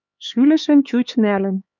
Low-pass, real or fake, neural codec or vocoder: 7.2 kHz; fake; codec, 16 kHz, 4 kbps, X-Codec, HuBERT features, trained on LibriSpeech